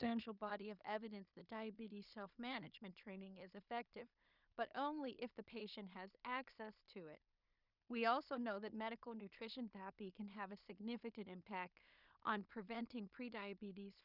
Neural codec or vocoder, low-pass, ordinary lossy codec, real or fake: codec, 16 kHz in and 24 kHz out, 0.4 kbps, LongCat-Audio-Codec, two codebook decoder; 5.4 kHz; Opus, 64 kbps; fake